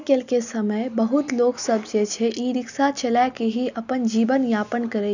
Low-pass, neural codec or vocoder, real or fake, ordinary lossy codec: 7.2 kHz; none; real; none